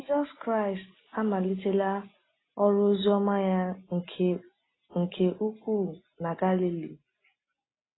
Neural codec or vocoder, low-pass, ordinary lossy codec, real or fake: none; 7.2 kHz; AAC, 16 kbps; real